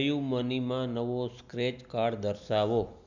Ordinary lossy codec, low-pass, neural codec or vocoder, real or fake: none; 7.2 kHz; none; real